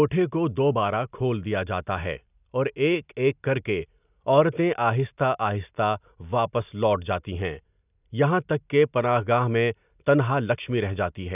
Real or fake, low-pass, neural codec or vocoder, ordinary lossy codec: real; 3.6 kHz; none; none